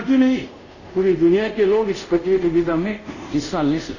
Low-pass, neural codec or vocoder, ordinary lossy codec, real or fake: 7.2 kHz; codec, 24 kHz, 0.5 kbps, DualCodec; none; fake